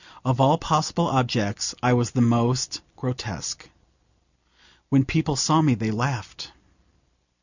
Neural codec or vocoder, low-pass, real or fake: none; 7.2 kHz; real